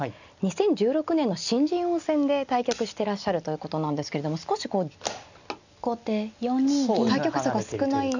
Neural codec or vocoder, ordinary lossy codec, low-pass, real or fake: none; none; 7.2 kHz; real